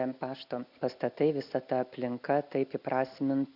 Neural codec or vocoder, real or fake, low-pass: none; real; 5.4 kHz